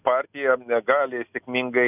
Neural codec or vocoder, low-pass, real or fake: none; 3.6 kHz; real